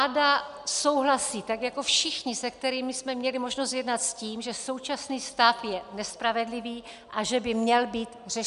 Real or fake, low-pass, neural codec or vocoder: real; 10.8 kHz; none